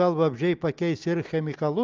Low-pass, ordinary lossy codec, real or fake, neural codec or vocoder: 7.2 kHz; Opus, 24 kbps; real; none